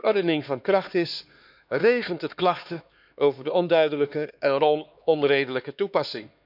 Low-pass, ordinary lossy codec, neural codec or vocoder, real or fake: 5.4 kHz; none; codec, 16 kHz, 2 kbps, X-Codec, HuBERT features, trained on LibriSpeech; fake